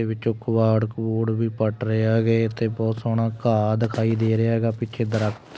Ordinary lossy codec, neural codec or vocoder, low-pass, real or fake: none; none; none; real